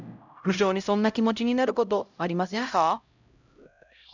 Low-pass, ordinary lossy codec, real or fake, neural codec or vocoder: 7.2 kHz; none; fake; codec, 16 kHz, 0.5 kbps, X-Codec, HuBERT features, trained on LibriSpeech